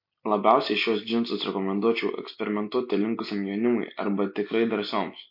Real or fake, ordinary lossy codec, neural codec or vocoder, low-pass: real; MP3, 32 kbps; none; 5.4 kHz